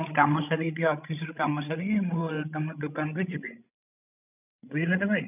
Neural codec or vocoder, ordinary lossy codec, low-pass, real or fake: codec, 16 kHz, 16 kbps, FreqCodec, larger model; none; 3.6 kHz; fake